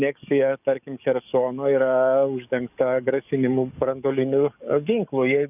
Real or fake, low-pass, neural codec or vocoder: fake; 3.6 kHz; codec, 44.1 kHz, 7.8 kbps, DAC